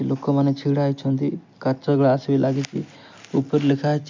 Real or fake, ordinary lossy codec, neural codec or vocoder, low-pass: real; MP3, 48 kbps; none; 7.2 kHz